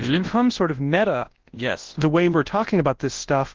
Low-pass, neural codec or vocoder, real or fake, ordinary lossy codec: 7.2 kHz; codec, 24 kHz, 0.9 kbps, WavTokenizer, large speech release; fake; Opus, 16 kbps